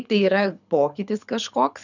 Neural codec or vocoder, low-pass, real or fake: codec, 24 kHz, 6 kbps, HILCodec; 7.2 kHz; fake